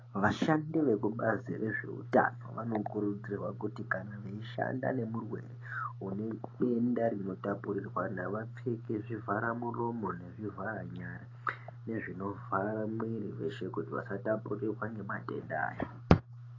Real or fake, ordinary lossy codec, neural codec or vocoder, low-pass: real; AAC, 32 kbps; none; 7.2 kHz